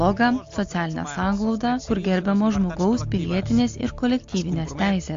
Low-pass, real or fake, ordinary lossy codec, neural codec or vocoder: 7.2 kHz; real; AAC, 48 kbps; none